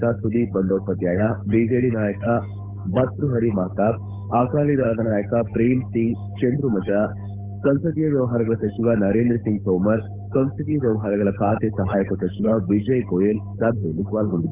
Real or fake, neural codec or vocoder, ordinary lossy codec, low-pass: fake; codec, 16 kHz, 8 kbps, FunCodec, trained on Chinese and English, 25 frames a second; none; 3.6 kHz